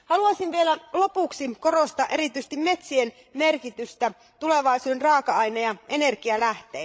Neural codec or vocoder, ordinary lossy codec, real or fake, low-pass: codec, 16 kHz, 16 kbps, FreqCodec, larger model; none; fake; none